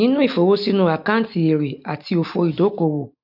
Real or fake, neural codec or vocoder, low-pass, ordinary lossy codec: real; none; 5.4 kHz; none